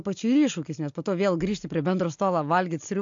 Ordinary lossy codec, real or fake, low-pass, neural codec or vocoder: AAC, 64 kbps; real; 7.2 kHz; none